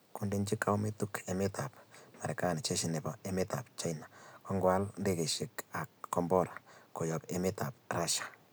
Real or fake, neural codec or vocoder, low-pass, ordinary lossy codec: real; none; none; none